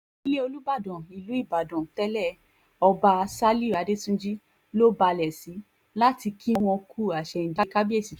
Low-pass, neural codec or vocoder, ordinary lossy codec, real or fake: 19.8 kHz; none; none; real